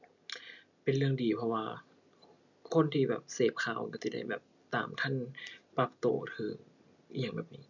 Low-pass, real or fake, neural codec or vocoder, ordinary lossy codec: 7.2 kHz; real; none; none